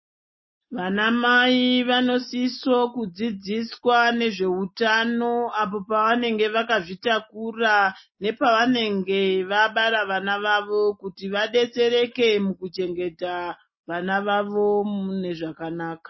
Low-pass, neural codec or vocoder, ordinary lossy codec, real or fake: 7.2 kHz; none; MP3, 24 kbps; real